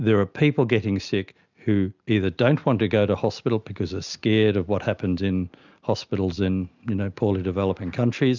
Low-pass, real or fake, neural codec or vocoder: 7.2 kHz; real; none